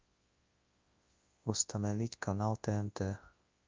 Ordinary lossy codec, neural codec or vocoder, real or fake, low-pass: Opus, 32 kbps; codec, 24 kHz, 0.9 kbps, WavTokenizer, large speech release; fake; 7.2 kHz